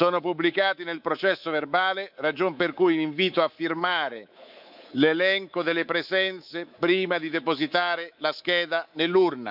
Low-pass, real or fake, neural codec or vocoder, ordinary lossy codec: 5.4 kHz; fake; codec, 24 kHz, 3.1 kbps, DualCodec; none